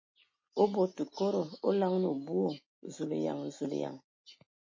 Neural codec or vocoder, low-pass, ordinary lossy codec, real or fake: none; 7.2 kHz; MP3, 32 kbps; real